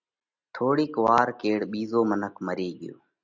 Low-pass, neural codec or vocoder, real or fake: 7.2 kHz; none; real